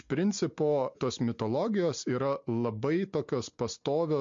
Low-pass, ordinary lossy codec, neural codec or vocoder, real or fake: 7.2 kHz; MP3, 48 kbps; none; real